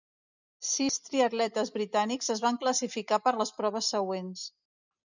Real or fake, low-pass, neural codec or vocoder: real; 7.2 kHz; none